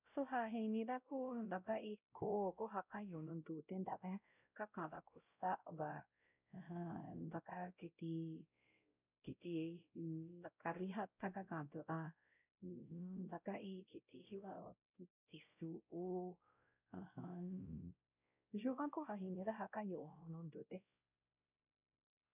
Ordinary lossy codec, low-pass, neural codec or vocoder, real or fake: none; 3.6 kHz; codec, 16 kHz, 0.5 kbps, X-Codec, WavLM features, trained on Multilingual LibriSpeech; fake